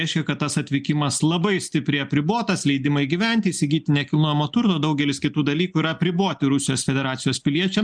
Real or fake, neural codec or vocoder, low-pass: real; none; 9.9 kHz